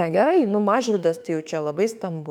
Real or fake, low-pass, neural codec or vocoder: fake; 19.8 kHz; autoencoder, 48 kHz, 32 numbers a frame, DAC-VAE, trained on Japanese speech